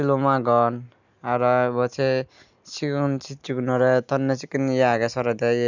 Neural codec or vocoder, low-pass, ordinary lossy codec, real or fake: none; 7.2 kHz; none; real